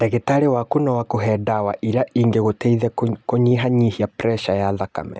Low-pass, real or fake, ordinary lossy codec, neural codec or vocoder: none; real; none; none